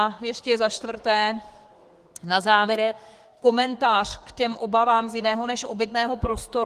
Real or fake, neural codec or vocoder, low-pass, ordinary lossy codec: fake; codec, 32 kHz, 1.9 kbps, SNAC; 14.4 kHz; Opus, 24 kbps